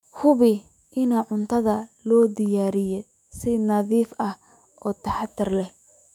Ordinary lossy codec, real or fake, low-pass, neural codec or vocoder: none; fake; 19.8 kHz; autoencoder, 48 kHz, 128 numbers a frame, DAC-VAE, trained on Japanese speech